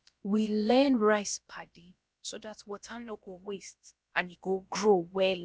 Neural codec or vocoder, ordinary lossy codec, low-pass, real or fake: codec, 16 kHz, about 1 kbps, DyCAST, with the encoder's durations; none; none; fake